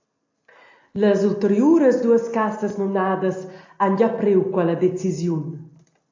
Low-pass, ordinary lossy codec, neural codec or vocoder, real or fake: 7.2 kHz; AAC, 48 kbps; none; real